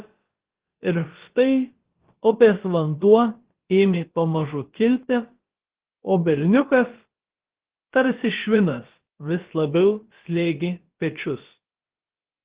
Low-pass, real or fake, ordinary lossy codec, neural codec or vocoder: 3.6 kHz; fake; Opus, 16 kbps; codec, 16 kHz, about 1 kbps, DyCAST, with the encoder's durations